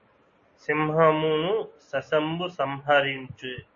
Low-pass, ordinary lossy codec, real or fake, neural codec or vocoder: 7.2 kHz; MP3, 32 kbps; real; none